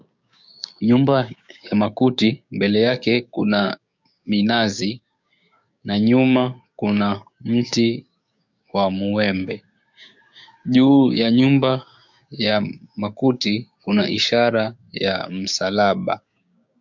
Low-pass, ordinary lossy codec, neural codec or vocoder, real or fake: 7.2 kHz; MP3, 64 kbps; codec, 16 kHz, 6 kbps, DAC; fake